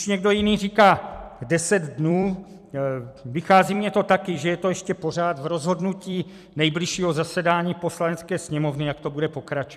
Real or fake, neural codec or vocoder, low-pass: fake; vocoder, 44.1 kHz, 128 mel bands every 256 samples, BigVGAN v2; 14.4 kHz